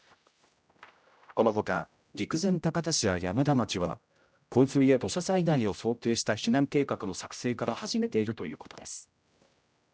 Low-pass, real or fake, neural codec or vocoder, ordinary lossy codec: none; fake; codec, 16 kHz, 0.5 kbps, X-Codec, HuBERT features, trained on general audio; none